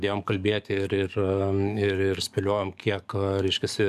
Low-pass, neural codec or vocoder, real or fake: 14.4 kHz; codec, 44.1 kHz, 7.8 kbps, DAC; fake